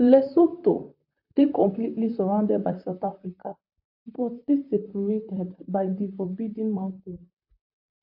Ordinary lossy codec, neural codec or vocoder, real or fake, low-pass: AAC, 48 kbps; vocoder, 44.1 kHz, 128 mel bands, Pupu-Vocoder; fake; 5.4 kHz